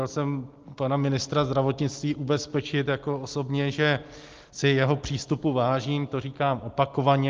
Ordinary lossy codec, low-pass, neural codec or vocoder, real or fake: Opus, 32 kbps; 7.2 kHz; none; real